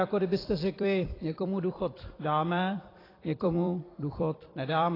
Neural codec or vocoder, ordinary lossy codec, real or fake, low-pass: vocoder, 44.1 kHz, 128 mel bands every 256 samples, BigVGAN v2; AAC, 24 kbps; fake; 5.4 kHz